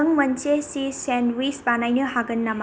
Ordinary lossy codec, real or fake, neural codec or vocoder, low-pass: none; real; none; none